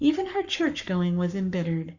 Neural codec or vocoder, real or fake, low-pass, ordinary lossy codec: codec, 44.1 kHz, 7.8 kbps, Pupu-Codec; fake; 7.2 kHz; Opus, 64 kbps